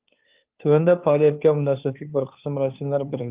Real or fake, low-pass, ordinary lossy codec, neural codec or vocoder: fake; 3.6 kHz; Opus, 24 kbps; codec, 16 kHz, 2 kbps, FunCodec, trained on Chinese and English, 25 frames a second